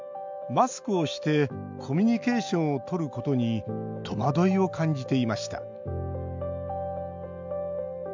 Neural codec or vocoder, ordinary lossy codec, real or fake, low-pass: none; MP3, 64 kbps; real; 7.2 kHz